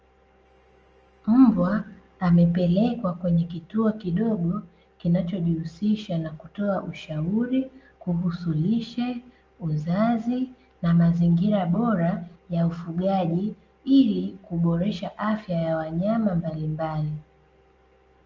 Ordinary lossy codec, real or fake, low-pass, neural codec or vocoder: Opus, 24 kbps; real; 7.2 kHz; none